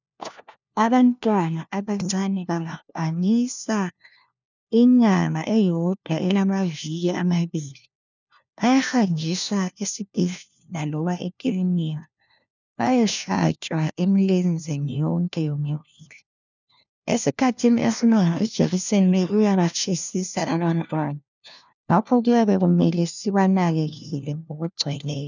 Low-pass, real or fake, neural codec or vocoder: 7.2 kHz; fake; codec, 16 kHz, 1 kbps, FunCodec, trained on LibriTTS, 50 frames a second